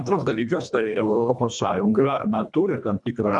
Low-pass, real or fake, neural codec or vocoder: 10.8 kHz; fake; codec, 24 kHz, 1.5 kbps, HILCodec